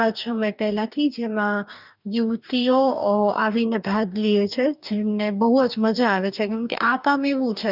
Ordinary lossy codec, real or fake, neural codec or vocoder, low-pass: none; fake; codec, 44.1 kHz, 2.6 kbps, DAC; 5.4 kHz